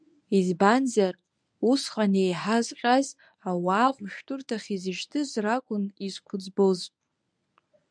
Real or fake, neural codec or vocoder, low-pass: fake; codec, 24 kHz, 0.9 kbps, WavTokenizer, medium speech release version 1; 9.9 kHz